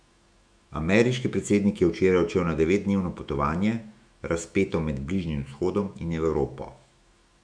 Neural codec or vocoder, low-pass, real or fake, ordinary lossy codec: autoencoder, 48 kHz, 128 numbers a frame, DAC-VAE, trained on Japanese speech; 9.9 kHz; fake; none